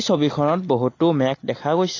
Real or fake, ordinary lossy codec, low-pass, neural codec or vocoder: real; AAC, 32 kbps; 7.2 kHz; none